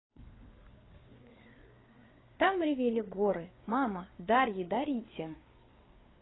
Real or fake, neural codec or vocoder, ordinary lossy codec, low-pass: fake; codec, 16 kHz, 4 kbps, FreqCodec, larger model; AAC, 16 kbps; 7.2 kHz